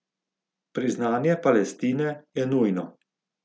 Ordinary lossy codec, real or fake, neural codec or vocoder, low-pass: none; real; none; none